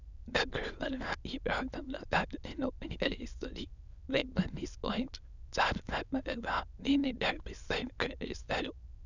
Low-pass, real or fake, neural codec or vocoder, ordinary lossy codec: 7.2 kHz; fake; autoencoder, 22.05 kHz, a latent of 192 numbers a frame, VITS, trained on many speakers; none